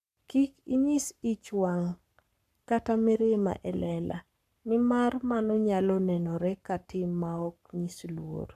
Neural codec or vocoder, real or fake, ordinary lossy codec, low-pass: codec, 44.1 kHz, 7.8 kbps, Pupu-Codec; fake; none; 14.4 kHz